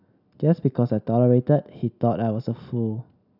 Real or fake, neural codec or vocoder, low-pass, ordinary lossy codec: real; none; 5.4 kHz; none